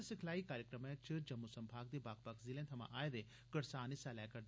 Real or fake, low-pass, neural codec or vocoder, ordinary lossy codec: real; none; none; none